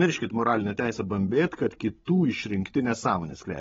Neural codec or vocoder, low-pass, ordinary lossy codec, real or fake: codec, 16 kHz, 16 kbps, FreqCodec, larger model; 7.2 kHz; AAC, 24 kbps; fake